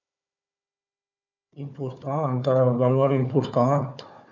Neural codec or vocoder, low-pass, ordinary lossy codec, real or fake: codec, 16 kHz, 4 kbps, FunCodec, trained on Chinese and English, 50 frames a second; 7.2 kHz; none; fake